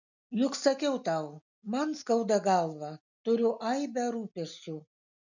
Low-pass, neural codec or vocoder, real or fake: 7.2 kHz; none; real